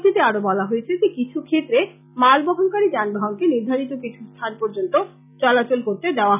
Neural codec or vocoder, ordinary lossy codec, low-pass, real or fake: none; none; 3.6 kHz; real